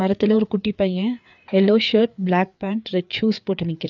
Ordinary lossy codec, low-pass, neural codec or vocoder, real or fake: none; 7.2 kHz; codec, 16 kHz, 4 kbps, FreqCodec, larger model; fake